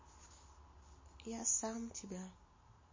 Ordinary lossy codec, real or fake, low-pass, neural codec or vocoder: MP3, 32 kbps; real; 7.2 kHz; none